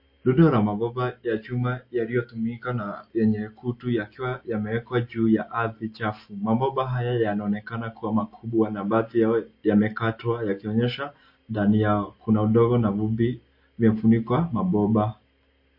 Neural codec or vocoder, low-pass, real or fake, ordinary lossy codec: none; 5.4 kHz; real; MP3, 32 kbps